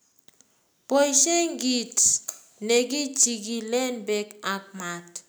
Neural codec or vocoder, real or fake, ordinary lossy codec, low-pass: none; real; none; none